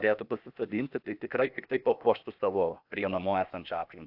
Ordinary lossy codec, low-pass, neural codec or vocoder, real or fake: AAC, 48 kbps; 5.4 kHz; codec, 16 kHz, 0.8 kbps, ZipCodec; fake